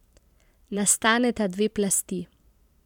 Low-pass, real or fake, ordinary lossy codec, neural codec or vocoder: 19.8 kHz; fake; none; vocoder, 44.1 kHz, 128 mel bands every 512 samples, BigVGAN v2